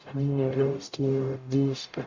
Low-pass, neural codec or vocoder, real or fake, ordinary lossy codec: 7.2 kHz; codec, 44.1 kHz, 0.9 kbps, DAC; fake; MP3, 64 kbps